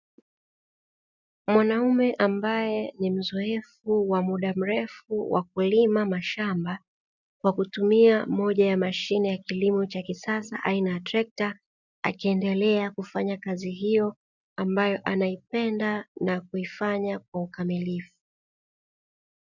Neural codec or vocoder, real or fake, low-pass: none; real; 7.2 kHz